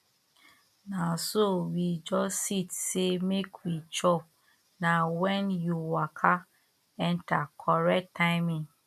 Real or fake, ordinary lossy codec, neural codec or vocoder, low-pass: real; MP3, 96 kbps; none; 14.4 kHz